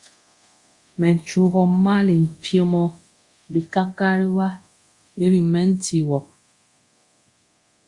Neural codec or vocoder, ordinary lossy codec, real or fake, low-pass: codec, 24 kHz, 0.9 kbps, DualCodec; Opus, 64 kbps; fake; 10.8 kHz